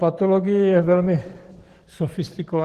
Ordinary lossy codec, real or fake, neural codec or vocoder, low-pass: Opus, 16 kbps; fake; codec, 44.1 kHz, 7.8 kbps, DAC; 14.4 kHz